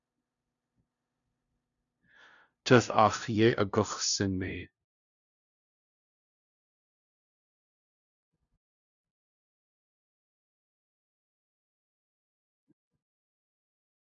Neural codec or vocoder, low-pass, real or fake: codec, 16 kHz, 0.5 kbps, FunCodec, trained on LibriTTS, 25 frames a second; 7.2 kHz; fake